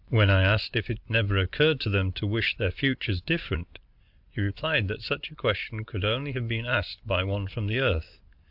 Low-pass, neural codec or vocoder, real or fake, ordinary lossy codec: 5.4 kHz; none; real; AAC, 48 kbps